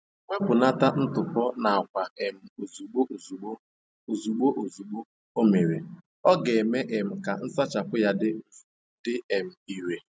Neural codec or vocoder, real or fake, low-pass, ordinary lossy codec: none; real; none; none